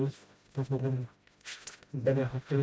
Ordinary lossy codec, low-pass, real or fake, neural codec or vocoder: none; none; fake; codec, 16 kHz, 0.5 kbps, FreqCodec, smaller model